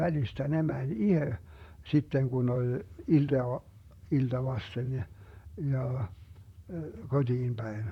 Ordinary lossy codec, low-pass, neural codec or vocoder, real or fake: none; 19.8 kHz; vocoder, 44.1 kHz, 128 mel bands, Pupu-Vocoder; fake